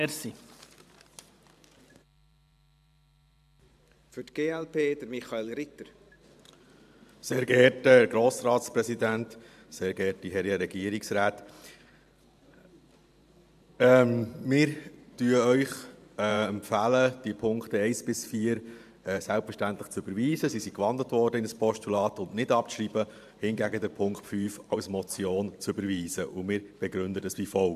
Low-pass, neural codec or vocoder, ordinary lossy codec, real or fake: 14.4 kHz; vocoder, 44.1 kHz, 128 mel bands every 512 samples, BigVGAN v2; AAC, 96 kbps; fake